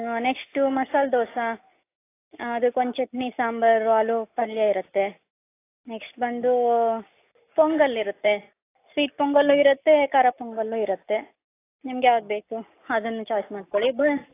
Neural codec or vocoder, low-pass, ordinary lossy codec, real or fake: none; 3.6 kHz; AAC, 24 kbps; real